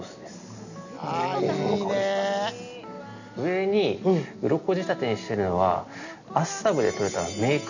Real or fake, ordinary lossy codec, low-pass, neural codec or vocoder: real; none; 7.2 kHz; none